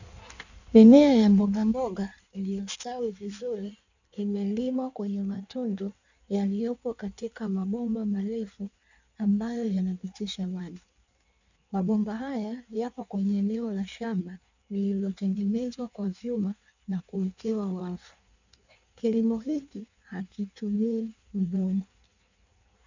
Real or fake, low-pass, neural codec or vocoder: fake; 7.2 kHz; codec, 16 kHz in and 24 kHz out, 1.1 kbps, FireRedTTS-2 codec